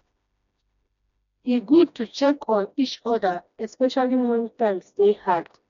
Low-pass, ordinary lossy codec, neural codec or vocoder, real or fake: 7.2 kHz; none; codec, 16 kHz, 1 kbps, FreqCodec, smaller model; fake